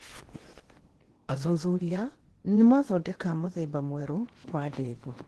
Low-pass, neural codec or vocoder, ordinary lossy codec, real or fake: 10.8 kHz; codec, 16 kHz in and 24 kHz out, 0.8 kbps, FocalCodec, streaming, 65536 codes; Opus, 16 kbps; fake